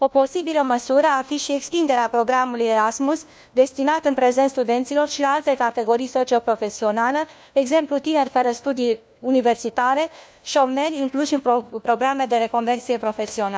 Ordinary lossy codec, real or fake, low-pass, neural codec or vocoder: none; fake; none; codec, 16 kHz, 1 kbps, FunCodec, trained on LibriTTS, 50 frames a second